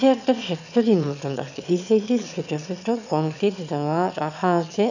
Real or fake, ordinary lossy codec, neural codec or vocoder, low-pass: fake; none; autoencoder, 22.05 kHz, a latent of 192 numbers a frame, VITS, trained on one speaker; 7.2 kHz